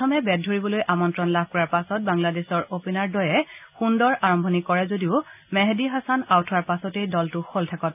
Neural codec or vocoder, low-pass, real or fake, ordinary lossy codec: none; 3.6 kHz; real; none